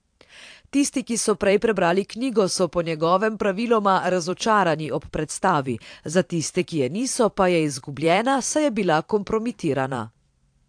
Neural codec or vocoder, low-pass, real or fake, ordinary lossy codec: vocoder, 44.1 kHz, 128 mel bands every 512 samples, BigVGAN v2; 9.9 kHz; fake; AAC, 64 kbps